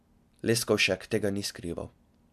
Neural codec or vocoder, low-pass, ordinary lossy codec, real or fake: none; 14.4 kHz; MP3, 96 kbps; real